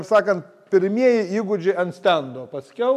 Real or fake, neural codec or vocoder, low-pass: real; none; 14.4 kHz